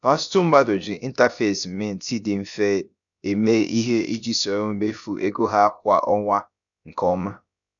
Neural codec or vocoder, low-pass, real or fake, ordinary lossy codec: codec, 16 kHz, about 1 kbps, DyCAST, with the encoder's durations; 7.2 kHz; fake; none